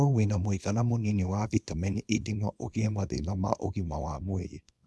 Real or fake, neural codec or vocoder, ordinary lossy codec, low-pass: fake; codec, 24 kHz, 0.9 kbps, WavTokenizer, small release; none; none